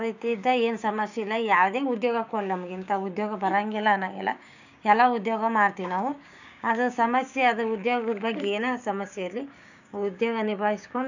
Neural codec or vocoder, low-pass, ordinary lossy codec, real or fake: codec, 16 kHz, 6 kbps, DAC; 7.2 kHz; none; fake